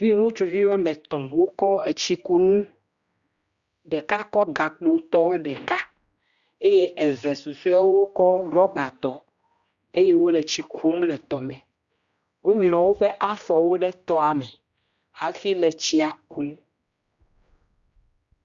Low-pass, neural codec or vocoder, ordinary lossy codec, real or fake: 7.2 kHz; codec, 16 kHz, 1 kbps, X-Codec, HuBERT features, trained on general audio; Opus, 64 kbps; fake